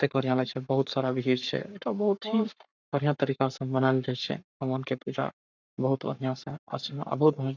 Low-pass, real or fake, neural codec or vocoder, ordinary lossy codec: 7.2 kHz; fake; codec, 44.1 kHz, 7.8 kbps, Pupu-Codec; none